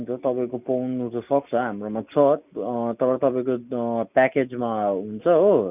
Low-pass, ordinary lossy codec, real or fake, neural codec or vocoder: 3.6 kHz; none; real; none